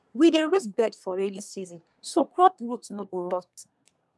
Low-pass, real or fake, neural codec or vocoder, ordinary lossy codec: none; fake; codec, 24 kHz, 1 kbps, SNAC; none